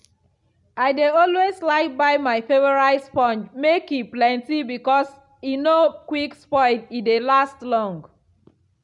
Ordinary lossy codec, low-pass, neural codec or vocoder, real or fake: none; 10.8 kHz; none; real